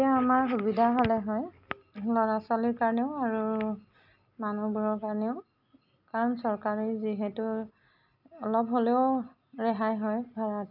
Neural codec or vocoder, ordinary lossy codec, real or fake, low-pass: none; none; real; 5.4 kHz